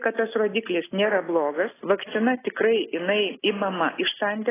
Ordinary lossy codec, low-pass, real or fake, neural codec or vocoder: AAC, 16 kbps; 3.6 kHz; real; none